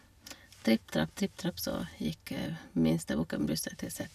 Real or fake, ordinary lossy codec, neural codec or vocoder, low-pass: real; none; none; 14.4 kHz